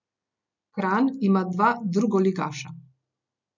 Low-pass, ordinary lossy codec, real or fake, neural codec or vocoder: 7.2 kHz; none; real; none